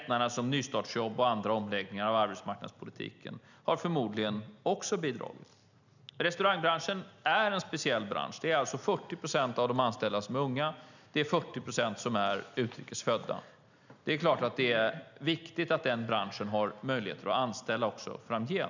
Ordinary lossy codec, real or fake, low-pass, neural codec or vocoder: none; real; 7.2 kHz; none